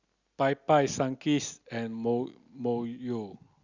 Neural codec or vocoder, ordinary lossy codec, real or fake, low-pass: none; Opus, 64 kbps; real; 7.2 kHz